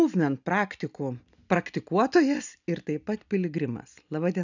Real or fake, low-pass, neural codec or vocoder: real; 7.2 kHz; none